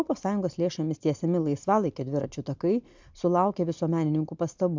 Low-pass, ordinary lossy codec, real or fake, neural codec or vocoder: 7.2 kHz; MP3, 64 kbps; real; none